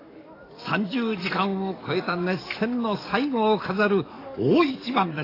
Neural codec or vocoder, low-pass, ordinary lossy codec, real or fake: codec, 44.1 kHz, 7.8 kbps, DAC; 5.4 kHz; AAC, 24 kbps; fake